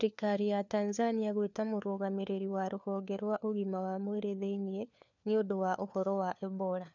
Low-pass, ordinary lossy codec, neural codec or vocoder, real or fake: 7.2 kHz; none; codec, 16 kHz, 4 kbps, FunCodec, trained on LibriTTS, 50 frames a second; fake